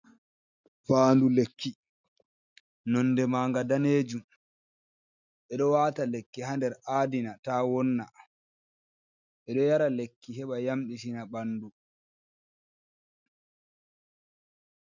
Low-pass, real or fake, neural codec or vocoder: 7.2 kHz; real; none